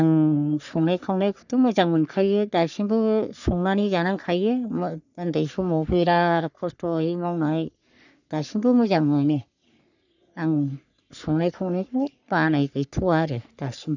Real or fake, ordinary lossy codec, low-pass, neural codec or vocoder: fake; none; 7.2 kHz; codec, 44.1 kHz, 3.4 kbps, Pupu-Codec